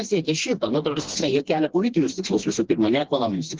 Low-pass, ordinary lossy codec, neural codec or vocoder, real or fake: 7.2 kHz; Opus, 16 kbps; codec, 16 kHz, 2 kbps, FreqCodec, smaller model; fake